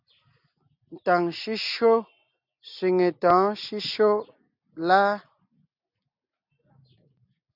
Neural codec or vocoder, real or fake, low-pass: none; real; 5.4 kHz